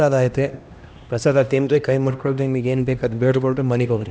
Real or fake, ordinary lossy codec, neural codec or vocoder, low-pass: fake; none; codec, 16 kHz, 1 kbps, X-Codec, HuBERT features, trained on LibriSpeech; none